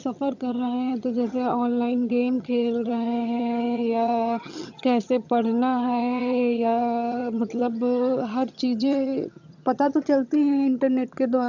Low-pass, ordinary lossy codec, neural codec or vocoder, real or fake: 7.2 kHz; none; vocoder, 22.05 kHz, 80 mel bands, HiFi-GAN; fake